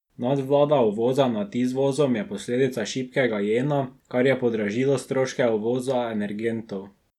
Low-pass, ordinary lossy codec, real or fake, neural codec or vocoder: 19.8 kHz; none; real; none